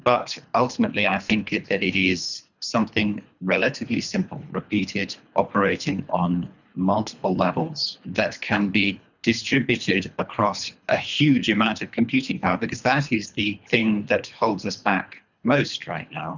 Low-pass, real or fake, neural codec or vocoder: 7.2 kHz; fake; codec, 24 kHz, 3 kbps, HILCodec